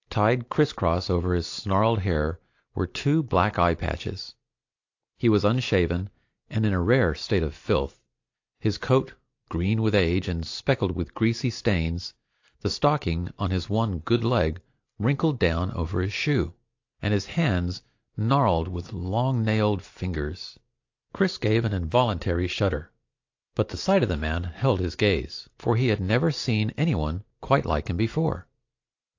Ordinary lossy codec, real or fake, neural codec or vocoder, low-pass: AAC, 48 kbps; real; none; 7.2 kHz